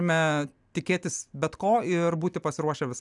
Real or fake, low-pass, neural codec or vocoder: real; 10.8 kHz; none